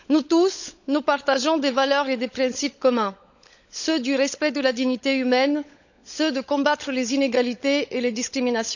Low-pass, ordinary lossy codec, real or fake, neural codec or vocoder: 7.2 kHz; none; fake; codec, 16 kHz, 16 kbps, FunCodec, trained on LibriTTS, 50 frames a second